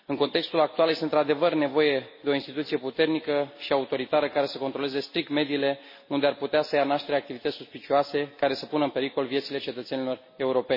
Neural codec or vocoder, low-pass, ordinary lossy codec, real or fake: none; 5.4 kHz; MP3, 24 kbps; real